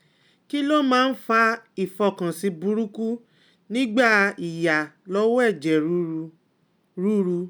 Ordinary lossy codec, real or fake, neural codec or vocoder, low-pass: none; real; none; none